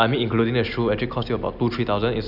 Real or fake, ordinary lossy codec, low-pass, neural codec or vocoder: real; none; 5.4 kHz; none